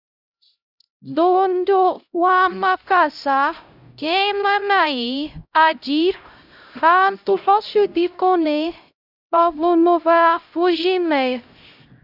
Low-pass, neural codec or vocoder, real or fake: 5.4 kHz; codec, 16 kHz, 0.5 kbps, X-Codec, HuBERT features, trained on LibriSpeech; fake